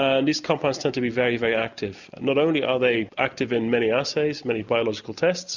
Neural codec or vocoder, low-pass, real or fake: none; 7.2 kHz; real